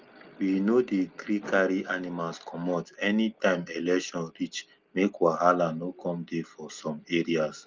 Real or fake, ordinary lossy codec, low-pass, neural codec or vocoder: real; Opus, 32 kbps; 7.2 kHz; none